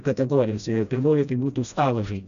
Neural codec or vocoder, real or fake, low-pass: codec, 16 kHz, 1 kbps, FreqCodec, smaller model; fake; 7.2 kHz